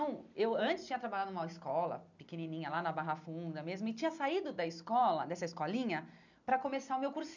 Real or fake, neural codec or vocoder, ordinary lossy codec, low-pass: real; none; none; 7.2 kHz